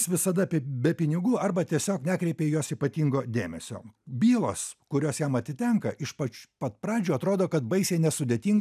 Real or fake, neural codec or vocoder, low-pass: real; none; 14.4 kHz